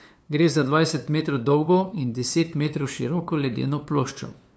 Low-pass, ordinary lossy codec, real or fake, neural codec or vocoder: none; none; fake; codec, 16 kHz, 2 kbps, FunCodec, trained on LibriTTS, 25 frames a second